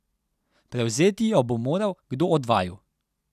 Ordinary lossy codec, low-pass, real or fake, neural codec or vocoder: none; 14.4 kHz; real; none